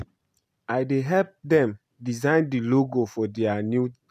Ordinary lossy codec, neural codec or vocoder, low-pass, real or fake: none; none; 14.4 kHz; real